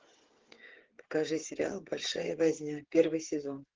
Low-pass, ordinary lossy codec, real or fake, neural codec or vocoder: 7.2 kHz; Opus, 16 kbps; fake; codec, 16 kHz, 8 kbps, FreqCodec, smaller model